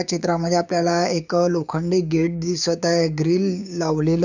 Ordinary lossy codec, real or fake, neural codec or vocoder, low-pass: none; fake; codec, 16 kHz, 6 kbps, DAC; 7.2 kHz